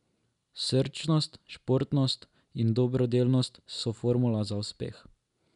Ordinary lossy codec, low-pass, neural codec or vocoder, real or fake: none; 10.8 kHz; none; real